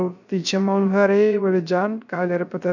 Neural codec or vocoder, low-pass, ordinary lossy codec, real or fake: codec, 16 kHz, 0.3 kbps, FocalCodec; 7.2 kHz; none; fake